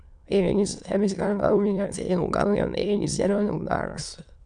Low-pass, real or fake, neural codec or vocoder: 9.9 kHz; fake; autoencoder, 22.05 kHz, a latent of 192 numbers a frame, VITS, trained on many speakers